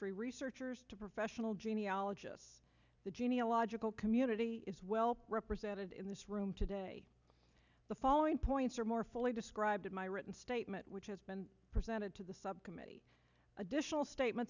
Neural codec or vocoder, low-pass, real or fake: none; 7.2 kHz; real